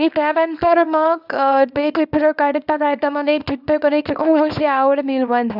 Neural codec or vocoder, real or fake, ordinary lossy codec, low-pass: codec, 24 kHz, 0.9 kbps, WavTokenizer, small release; fake; none; 5.4 kHz